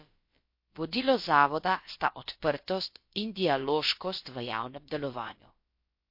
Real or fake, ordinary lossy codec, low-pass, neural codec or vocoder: fake; MP3, 32 kbps; 5.4 kHz; codec, 16 kHz, about 1 kbps, DyCAST, with the encoder's durations